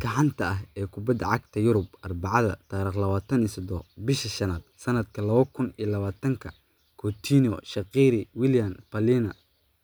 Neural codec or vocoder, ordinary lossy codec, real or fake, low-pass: none; none; real; none